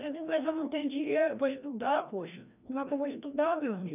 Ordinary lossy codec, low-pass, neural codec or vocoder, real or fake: none; 3.6 kHz; codec, 16 kHz, 1 kbps, FreqCodec, larger model; fake